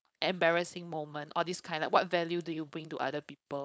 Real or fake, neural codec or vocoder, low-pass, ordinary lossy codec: fake; codec, 16 kHz, 4.8 kbps, FACodec; none; none